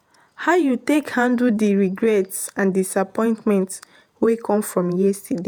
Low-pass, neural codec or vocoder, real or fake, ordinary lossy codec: none; vocoder, 48 kHz, 128 mel bands, Vocos; fake; none